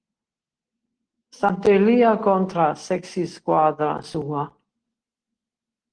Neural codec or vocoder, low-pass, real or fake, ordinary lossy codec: none; 9.9 kHz; real; Opus, 16 kbps